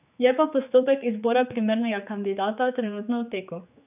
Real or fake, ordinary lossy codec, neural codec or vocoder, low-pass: fake; none; codec, 16 kHz, 4 kbps, X-Codec, HuBERT features, trained on general audio; 3.6 kHz